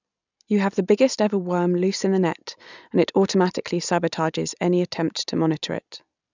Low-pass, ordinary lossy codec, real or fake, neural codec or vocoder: 7.2 kHz; none; real; none